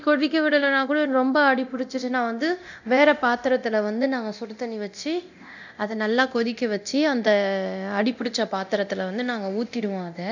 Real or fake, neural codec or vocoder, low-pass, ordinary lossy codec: fake; codec, 24 kHz, 0.9 kbps, DualCodec; 7.2 kHz; none